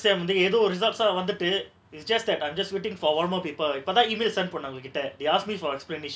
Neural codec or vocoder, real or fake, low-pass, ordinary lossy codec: none; real; none; none